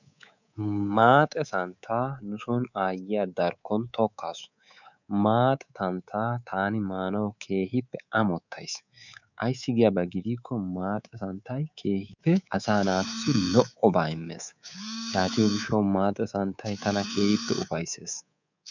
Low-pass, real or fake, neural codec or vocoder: 7.2 kHz; fake; codec, 24 kHz, 3.1 kbps, DualCodec